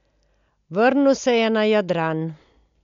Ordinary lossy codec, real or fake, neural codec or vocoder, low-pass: MP3, 64 kbps; real; none; 7.2 kHz